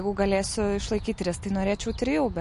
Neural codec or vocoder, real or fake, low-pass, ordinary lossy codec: none; real; 14.4 kHz; MP3, 48 kbps